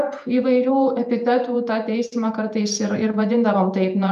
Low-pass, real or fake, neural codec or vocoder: 14.4 kHz; fake; vocoder, 48 kHz, 128 mel bands, Vocos